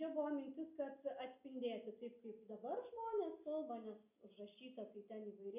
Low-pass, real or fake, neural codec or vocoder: 3.6 kHz; real; none